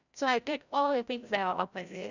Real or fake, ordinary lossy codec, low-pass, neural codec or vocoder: fake; none; 7.2 kHz; codec, 16 kHz, 0.5 kbps, FreqCodec, larger model